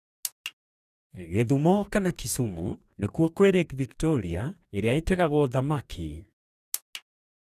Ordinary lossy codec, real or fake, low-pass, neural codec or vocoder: none; fake; 14.4 kHz; codec, 44.1 kHz, 2.6 kbps, DAC